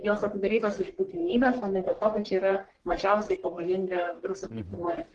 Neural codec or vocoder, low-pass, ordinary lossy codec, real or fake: codec, 44.1 kHz, 1.7 kbps, Pupu-Codec; 10.8 kHz; Opus, 16 kbps; fake